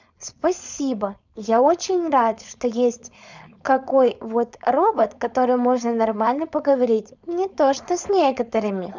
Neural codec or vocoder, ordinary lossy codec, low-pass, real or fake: codec, 16 kHz, 4.8 kbps, FACodec; none; 7.2 kHz; fake